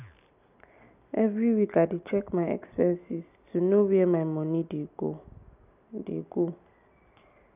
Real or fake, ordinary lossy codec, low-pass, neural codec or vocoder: real; none; 3.6 kHz; none